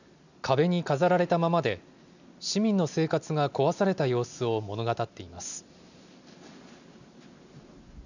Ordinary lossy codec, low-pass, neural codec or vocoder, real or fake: none; 7.2 kHz; none; real